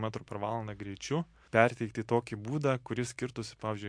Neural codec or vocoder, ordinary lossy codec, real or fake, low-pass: none; MP3, 64 kbps; real; 9.9 kHz